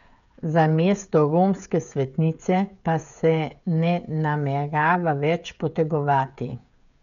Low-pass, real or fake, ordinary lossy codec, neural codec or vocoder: 7.2 kHz; fake; none; codec, 16 kHz, 16 kbps, FreqCodec, smaller model